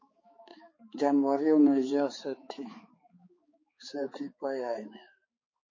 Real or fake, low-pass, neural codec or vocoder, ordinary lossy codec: fake; 7.2 kHz; codec, 16 kHz, 4 kbps, X-Codec, HuBERT features, trained on balanced general audio; MP3, 32 kbps